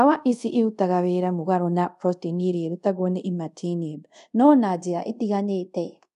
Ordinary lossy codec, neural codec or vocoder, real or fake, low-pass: none; codec, 24 kHz, 0.5 kbps, DualCodec; fake; 10.8 kHz